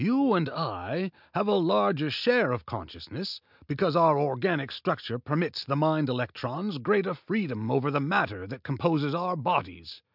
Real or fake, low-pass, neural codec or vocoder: real; 5.4 kHz; none